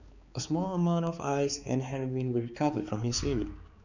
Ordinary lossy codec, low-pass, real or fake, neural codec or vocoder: none; 7.2 kHz; fake; codec, 16 kHz, 4 kbps, X-Codec, HuBERT features, trained on balanced general audio